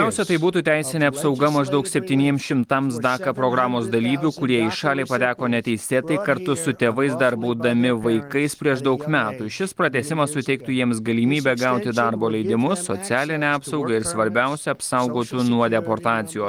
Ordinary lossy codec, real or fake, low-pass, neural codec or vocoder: Opus, 24 kbps; real; 19.8 kHz; none